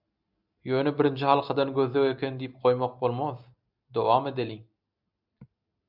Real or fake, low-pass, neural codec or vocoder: real; 5.4 kHz; none